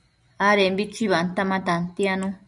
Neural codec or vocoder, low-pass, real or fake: none; 10.8 kHz; real